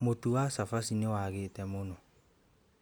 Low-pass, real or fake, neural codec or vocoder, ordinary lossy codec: none; real; none; none